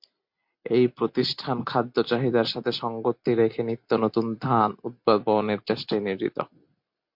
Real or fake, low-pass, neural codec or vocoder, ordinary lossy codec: real; 5.4 kHz; none; MP3, 48 kbps